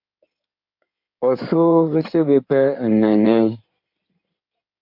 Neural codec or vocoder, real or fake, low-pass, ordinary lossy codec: codec, 16 kHz in and 24 kHz out, 2.2 kbps, FireRedTTS-2 codec; fake; 5.4 kHz; MP3, 48 kbps